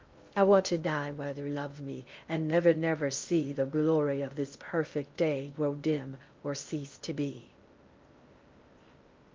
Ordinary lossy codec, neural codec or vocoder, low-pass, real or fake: Opus, 32 kbps; codec, 16 kHz in and 24 kHz out, 0.6 kbps, FocalCodec, streaming, 2048 codes; 7.2 kHz; fake